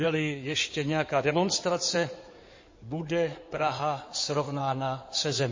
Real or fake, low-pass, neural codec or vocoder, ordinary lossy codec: fake; 7.2 kHz; codec, 16 kHz in and 24 kHz out, 2.2 kbps, FireRedTTS-2 codec; MP3, 32 kbps